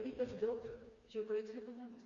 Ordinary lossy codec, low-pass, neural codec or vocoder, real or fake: Opus, 64 kbps; 7.2 kHz; codec, 16 kHz, 2 kbps, FreqCodec, smaller model; fake